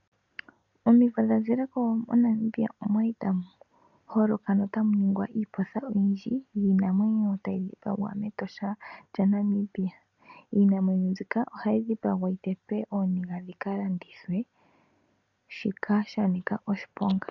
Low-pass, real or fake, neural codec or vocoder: 7.2 kHz; real; none